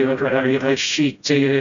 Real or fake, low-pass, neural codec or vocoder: fake; 7.2 kHz; codec, 16 kHz, 0.5 kbps, FreqCodec, smaller model